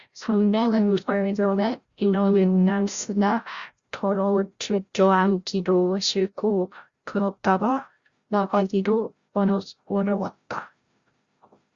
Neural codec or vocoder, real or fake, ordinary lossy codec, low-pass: codec, 16 kHz, 0.5 kbps, FreqCodec, larger model; fake; Opus, 64 kbps; 7.2 kHz